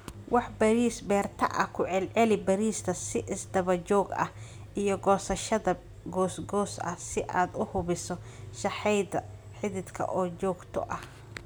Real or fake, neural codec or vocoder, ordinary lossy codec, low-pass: real; none; none; none